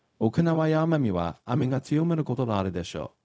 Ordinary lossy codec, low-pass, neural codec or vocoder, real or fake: none; none; codec, 16 kHz, 0.4 kbps, LongCat-Audio-Codec; fake